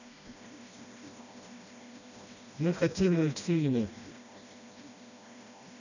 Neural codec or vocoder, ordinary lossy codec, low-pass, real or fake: codec, 16 kHz, 1 kbps, FreqCodec, smaller model; none; 7.2 kHz; fake